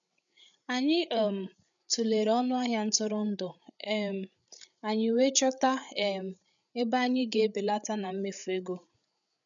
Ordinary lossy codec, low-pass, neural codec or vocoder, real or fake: none; 7.2 kHz; codec, 16 kHz, 8 kbps, FreqCodec, larger model; fake